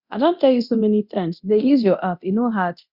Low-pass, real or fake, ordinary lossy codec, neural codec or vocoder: 5.4 kHz; fake; Opus, 64 kbps; codec, 24 kHz, 0.9 kbps, DualCodec